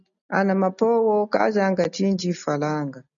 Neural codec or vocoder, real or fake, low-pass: none; real; 7.2 kHz